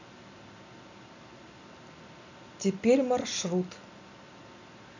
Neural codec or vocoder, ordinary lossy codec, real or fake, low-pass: none; none; real; 7.2 kHz